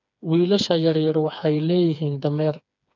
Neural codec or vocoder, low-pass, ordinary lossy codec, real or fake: codec, 16 kHz, 4 kbps, FreqCodec, smaller model; 7.2 kHz; none; fake